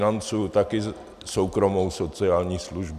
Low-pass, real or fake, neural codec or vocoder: 14.4 kHz; real; none